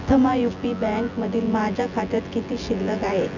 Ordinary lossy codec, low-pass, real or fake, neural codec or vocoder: none; 7.2 kHz; fake; vocoder, 24 kHz, 100 mel bands, Vocos